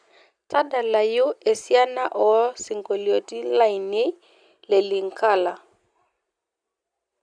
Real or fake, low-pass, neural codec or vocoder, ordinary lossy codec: real; 9.9 kHz; none; Opus, 64 kbps